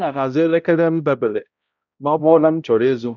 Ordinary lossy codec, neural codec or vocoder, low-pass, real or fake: none; codec, 16 kHz, 0.5 kbps, X-Codec, HuBERT features, trained on balanced general audio; 7.2 kHz; fake